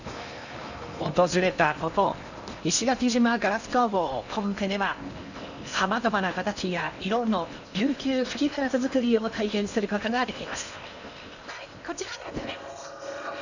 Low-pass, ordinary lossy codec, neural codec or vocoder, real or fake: 7.2 kHz; none; codec, 16 kHz in and 24 kHz out, 0.8 kbps, FocalCodec, streaming, 65536 codes; fake